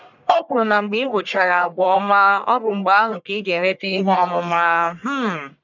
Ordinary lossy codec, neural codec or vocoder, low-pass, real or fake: none; codec, 44.1 kHz, 1.7 kbps, Pupu-Codec; 7.2 kHz; fake